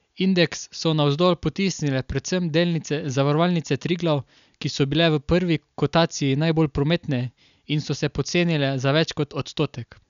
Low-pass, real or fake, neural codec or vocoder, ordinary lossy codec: 7.2 kHz; real; none; none